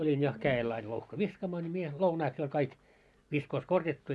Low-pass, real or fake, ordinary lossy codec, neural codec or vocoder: none; real; none; none